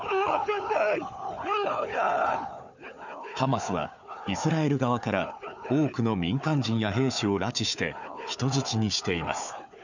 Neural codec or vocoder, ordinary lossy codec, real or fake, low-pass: codec, 16 kHz, 4 kbps, FunCodec, trained on Chinese and English, 50 frames a second; none; fake; 7.2 kHz